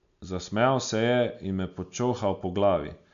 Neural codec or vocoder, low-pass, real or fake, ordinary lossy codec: none; 7.2 kHz; real; MP3, 64 kbps